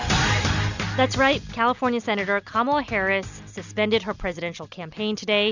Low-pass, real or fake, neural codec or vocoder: 7.2 kHz; real; none